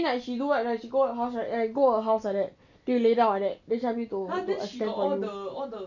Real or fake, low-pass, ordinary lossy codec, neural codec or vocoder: real; 7.2 kHz; none; none